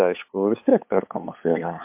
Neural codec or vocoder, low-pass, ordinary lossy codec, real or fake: codec, 16 kHz, 8 kbps, FunCodec, trained on LibriTTS, 25 frames a second; 3.6 kHz; MP3, 32 kbps; fake